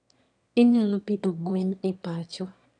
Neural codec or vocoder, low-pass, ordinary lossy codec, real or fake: autoencoder, 22.05 kHz, a latent of 192 numbers a frame, VITS, trained on one speaker; 9.9 kHz; none; fake